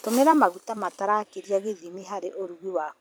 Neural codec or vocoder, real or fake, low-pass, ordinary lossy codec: vocoder, 44.1 kHz, 128 mel bands every 256 samples, BigVGAN v2; fake; none; none